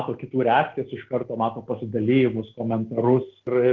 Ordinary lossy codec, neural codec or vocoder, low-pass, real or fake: Opus, 16 kbps; none; 7.2 kHz; real